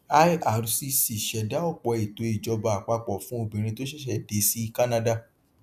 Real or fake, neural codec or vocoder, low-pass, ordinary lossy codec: real; none; 14.4 kHz; none